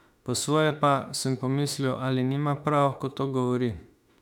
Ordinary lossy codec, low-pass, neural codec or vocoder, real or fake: none; 19.8 kHz; autoencoder, 48 kHz, 32 numbers a frame, DAC-VAE, trained on Japanese speech; fake